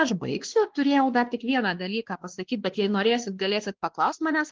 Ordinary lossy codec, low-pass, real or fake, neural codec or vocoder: Opus, 16 kbps; 7.2 kHz; fake; codec, 16 kHz, 2 kbps, X-Codec, WavLM features, trained on Multilingual LibriSpeech